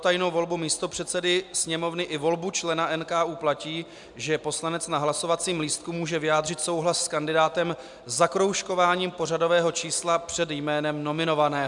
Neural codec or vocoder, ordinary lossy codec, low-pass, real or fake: none; MP3, 96 kbps; 10.8 kHz; real